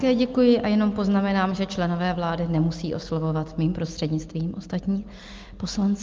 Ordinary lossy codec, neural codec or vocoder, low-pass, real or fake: Opus, 24 kbps; none; 7.2 kHz; real